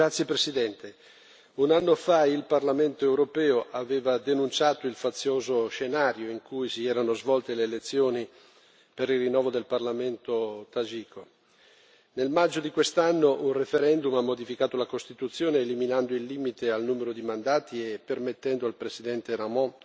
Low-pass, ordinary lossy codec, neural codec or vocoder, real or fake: none; none; none; real